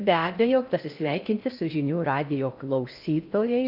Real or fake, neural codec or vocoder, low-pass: fake; codec, 16 kHz in and 24 kHz out, 0.6 kbps, FocalCodec, streaming, 4096 codes; 5.4 kHz